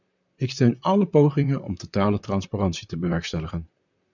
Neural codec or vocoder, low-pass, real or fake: vocoder, 44.1 kHz, 128 mel bands, Pupu-Vocoder; 7.2 kHz; fake